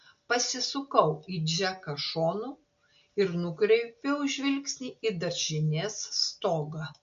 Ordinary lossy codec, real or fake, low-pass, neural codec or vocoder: MP3, 48 kbps; real; 7.2 kHz; none